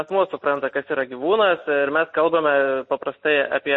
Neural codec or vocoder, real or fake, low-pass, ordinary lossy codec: none; real; 10.8 kHz; MP3, 32 kbps